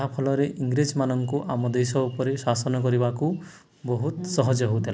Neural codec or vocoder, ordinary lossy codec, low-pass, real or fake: none; none; none; real